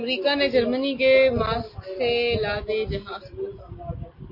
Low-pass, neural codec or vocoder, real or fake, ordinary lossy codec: 5.4 kHz; none; real; MP3, 32 kbps